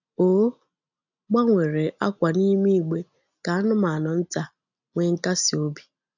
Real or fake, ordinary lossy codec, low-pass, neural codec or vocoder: real; none; 7.2 kHz; none